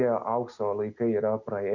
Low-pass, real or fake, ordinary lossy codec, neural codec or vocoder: 7.2 kHz; real; Opus, 64 kbps; none